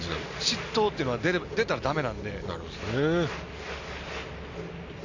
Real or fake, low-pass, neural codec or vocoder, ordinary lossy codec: real; 7.2 kHz; none; AAC, 48 kbps